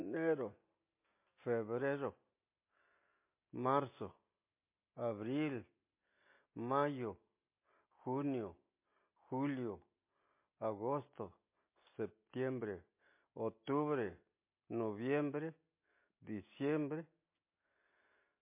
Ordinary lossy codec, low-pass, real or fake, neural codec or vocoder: MP3, 24 kbps; 3.6 kHz; real; none